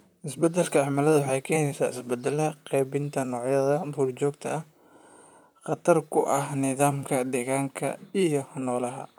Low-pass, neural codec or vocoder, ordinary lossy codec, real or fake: none; vocoder, 44.1 kHz, 128 mel bands, Pupu-Vocoder; none; fake